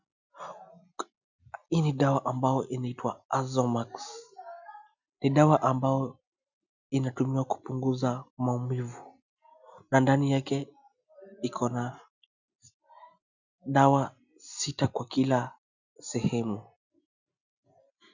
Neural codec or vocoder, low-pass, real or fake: none; 7.2 kHz; real